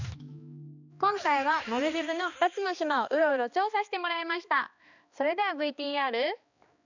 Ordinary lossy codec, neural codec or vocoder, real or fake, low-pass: none; codec, 16 kHz, 2 kbps, X-Codec, HuBERT features, trained on balanced general audio; fake; 7.2 kHz